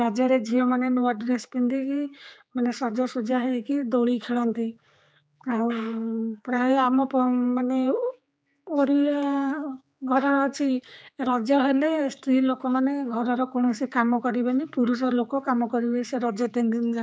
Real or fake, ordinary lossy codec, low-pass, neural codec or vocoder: fake; none; none; codec, 16 kHz, 4 kbps, X-Codec, HuBERT features, trained on general audio